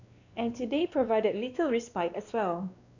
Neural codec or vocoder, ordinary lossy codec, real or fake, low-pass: codec, 16 kHz, 2 kbps, X-Codec, WavLM features, trained on Multilingual LibriSpeech; none; fake; 7.2 kHz